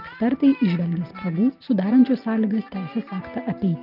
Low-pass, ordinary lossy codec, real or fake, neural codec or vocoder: 5.4 kHz; Opus, 32 kbps; real; none